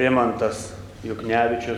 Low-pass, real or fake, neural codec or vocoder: 19.8 kHz; real; none